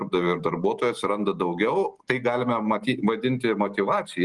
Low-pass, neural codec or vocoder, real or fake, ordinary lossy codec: 10.8 kHz; none; real; Opus, 24 kbps